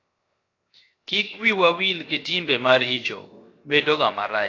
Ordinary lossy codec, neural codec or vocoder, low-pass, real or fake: AAC, 32 kbps; codec, 16 kHz, 0.7 kbps, FocalCodec; 7.2 kHz; fake